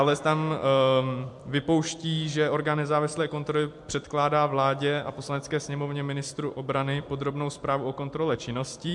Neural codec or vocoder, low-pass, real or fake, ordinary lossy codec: vocoder, 44.1 kHz, 128 mel bands every 256 samples, BigVGAN v2; 10.8 kHz; fake; MP3, 64 kbps